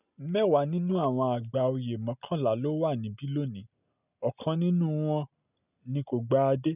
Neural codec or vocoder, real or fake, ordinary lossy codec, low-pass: none; real; none; 3.6 kHz